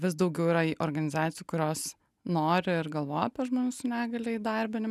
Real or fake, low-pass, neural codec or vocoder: real; 14.4 kHz; none